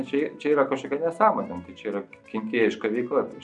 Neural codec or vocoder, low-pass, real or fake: none; 10.8 kHz; real